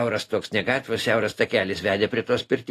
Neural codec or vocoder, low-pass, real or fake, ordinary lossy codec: none; 14.4 kHz; real; AAC, 48 kbps